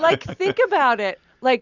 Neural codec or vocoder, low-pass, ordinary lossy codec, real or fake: none; 7.2 kHz; Opus, 64 kbps; real